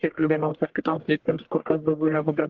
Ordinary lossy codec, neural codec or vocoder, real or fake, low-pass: Opus, 16 kbps; codec, 44.1 kHz, 1.7 kbps, Pupu-Codec; fake; 7.2 kHz